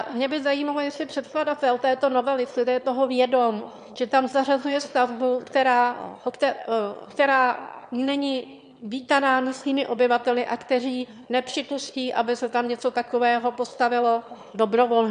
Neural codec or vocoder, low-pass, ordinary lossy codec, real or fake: autoencoder, 22.05 kHz, a latent of 192 numbers a frame, VITS, trained on one speaker; 9.9 kHz; MP3, 64 kbps; fake